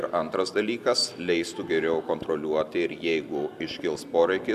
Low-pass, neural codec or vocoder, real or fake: 14.4 kHz; none; real